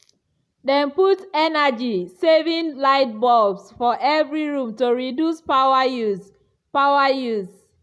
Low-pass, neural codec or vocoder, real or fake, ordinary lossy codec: none; none; real; none